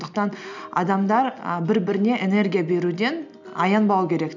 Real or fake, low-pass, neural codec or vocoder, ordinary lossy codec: real; 7.2 kHz; none; none